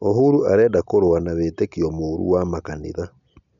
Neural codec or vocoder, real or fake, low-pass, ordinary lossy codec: none; real; 7.2 kHz; none